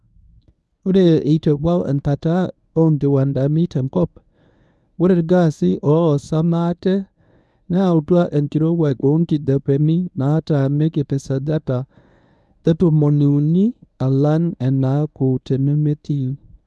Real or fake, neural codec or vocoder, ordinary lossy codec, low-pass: fake; codec, 24 kHz, 0.9 kbps, WavTokenizer, medium speech release version 1; none; none